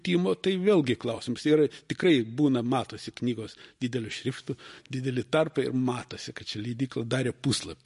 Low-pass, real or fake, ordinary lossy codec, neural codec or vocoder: 14.4 kHz; real; MP3, 48 kbps; none